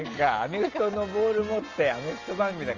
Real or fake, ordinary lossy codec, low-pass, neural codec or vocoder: real; Opus, 24 kbps; 7.2 kHz; none